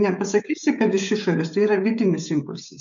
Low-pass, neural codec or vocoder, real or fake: 7.2 kHz; codec, 16 kHz, 16 kbps, FreqCodec, smaller model; fake